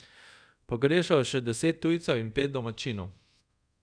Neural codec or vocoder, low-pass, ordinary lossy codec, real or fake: codec, 24 kHz, 0.5 kbps, DualCodec; 9.9 kHz; none; fake